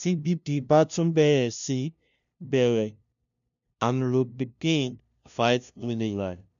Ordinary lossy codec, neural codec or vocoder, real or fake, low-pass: none; codec, 16 kHz, 0.5 kbps, FunCodec, trained on LibriTTS, 25 frames a second; fake; 7.2 kHz